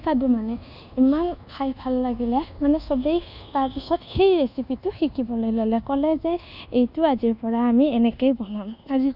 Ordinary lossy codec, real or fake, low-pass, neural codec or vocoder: none; fake; 5.4 kHz; codec, 24 kHz, 1.2 kbps, DualCodec